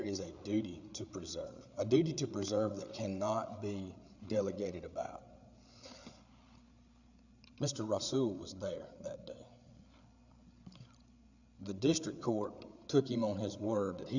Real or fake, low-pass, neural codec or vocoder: fake; 7.2 kHz; codec, 16 kHz, 8 kbps, FreqCodec, larger model